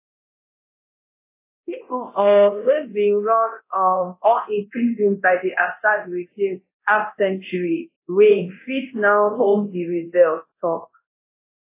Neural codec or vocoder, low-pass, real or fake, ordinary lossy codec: codec, 24 kHz, 0.9 kbps, DualCodec; 3.6 kHz; fake; AAC, 24 kbps